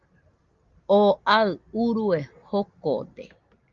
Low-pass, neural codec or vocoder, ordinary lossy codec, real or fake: 7.2 kHz; none; Opus, 32 kbps; real